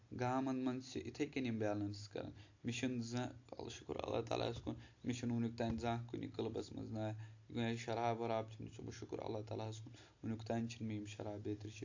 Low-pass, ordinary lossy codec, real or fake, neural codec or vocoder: 7.2 kHz; AAC, 48 kbps; real; none